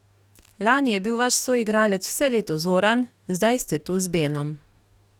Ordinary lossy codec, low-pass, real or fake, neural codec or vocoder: none; 19.8 kHz; fake; codec, 44.1 kHz, 2.6 kbps, DAC